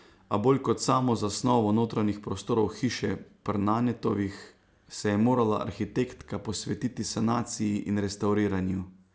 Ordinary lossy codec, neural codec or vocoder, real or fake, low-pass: none; none; real; none